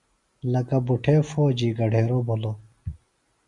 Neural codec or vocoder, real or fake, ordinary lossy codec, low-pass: none; real; Opus, 64 kbps; 10.8 kHz